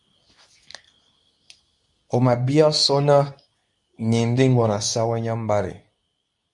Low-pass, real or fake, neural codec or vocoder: 10.8 kHz; fake; codec, 24 kHz, 0.9 kbps, WavTokenizer, medium speech release version 2